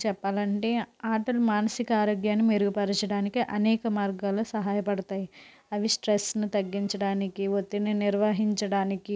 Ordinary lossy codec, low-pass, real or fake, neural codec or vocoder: none; none; real; none